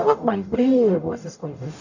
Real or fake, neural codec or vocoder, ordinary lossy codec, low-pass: fake; codec, 44.1 kHz, 0.9 kbps, DAC; none; 7.2 kHz